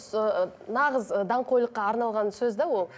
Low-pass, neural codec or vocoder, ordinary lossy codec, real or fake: none; none; none; real